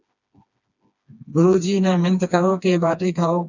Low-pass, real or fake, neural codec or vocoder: 7.2 kHz; fake; codec, 16 kHz, 2 kbps, FreqCodec, smaller model